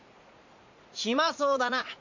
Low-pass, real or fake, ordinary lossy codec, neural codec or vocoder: 7.2 kHz; fake; MP3, 64 kbps; vocoder, 44.1 kHz, 80 mel bands, Vocos